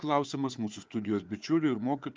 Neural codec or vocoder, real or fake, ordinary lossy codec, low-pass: codec, 16 kHz, 4 kbps, FunCodec, trained on Chinese and English, 50 frames a second; fake; Opus, 24 kbps; 7.2 kHz